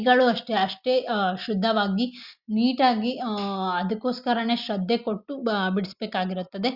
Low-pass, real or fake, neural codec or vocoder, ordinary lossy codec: 5.4 kHz; real; none; Opus, 64 kbps